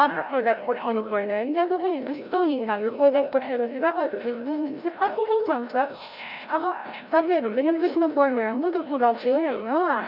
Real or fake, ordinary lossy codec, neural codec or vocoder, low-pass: fake; none; codec, 16 kHz, 0.5 kbps, FreqCodec, larger model; 5.4 kHz